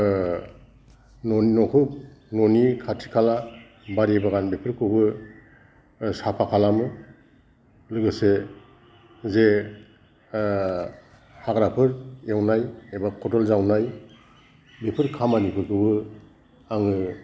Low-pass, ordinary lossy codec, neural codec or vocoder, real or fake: none; none; none; real